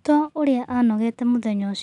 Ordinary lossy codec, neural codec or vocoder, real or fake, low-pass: none; none; real; 10.8 kHz